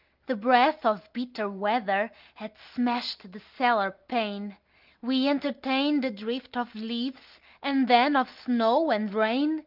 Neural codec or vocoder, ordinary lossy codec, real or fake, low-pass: none; Opus, 24 kbps; real; 5.4 kHz